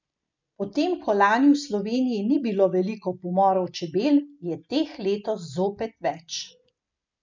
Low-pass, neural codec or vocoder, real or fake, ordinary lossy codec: 7.2 kHz; none; real; MP3, 64 kbps